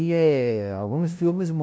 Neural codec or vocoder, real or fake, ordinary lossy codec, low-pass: codec, 16 kHz, 0.5 kbps, FunCodec, trained on LibriTTS, 25 frames a second; fake; none; none